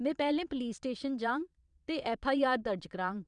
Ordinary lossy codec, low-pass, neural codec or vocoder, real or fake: none; 9.9 kHz; vocoder, 22.05 kHz, 80 mel bands, Vocos; fake